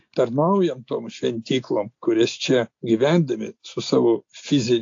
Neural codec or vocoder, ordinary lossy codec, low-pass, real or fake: none; AAC, 48 kbps; 7.2 kHz; real